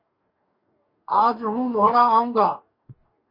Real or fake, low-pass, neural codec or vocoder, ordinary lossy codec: fake; 5.4 kHz; codec, 44.1 kHz, 2.6 kbps, DAC; MP3, 32 kbps